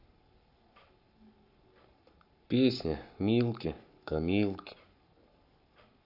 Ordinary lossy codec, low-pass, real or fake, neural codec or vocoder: none; 5.4 kHz; fake; codec, 44.1 kHz, 7.8 kbps, Pupu-Codec